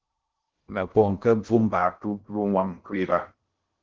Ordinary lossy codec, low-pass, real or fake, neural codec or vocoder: Opus, 16 kbps; 7.2 kHz; fake; codec, 16 kHz in and 24 kHz out, 0.6 kbps, FocalCodec, streaming, 2048 codes